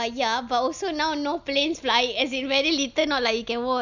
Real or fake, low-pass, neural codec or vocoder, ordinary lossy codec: real; 7.2 kHz; none; none